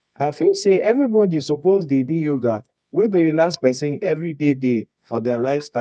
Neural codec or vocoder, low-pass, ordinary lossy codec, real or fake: codec, 24 kHz, 0.9 kbps, WavTokenizer, medium music audio release; 10.8 kHz; none; fake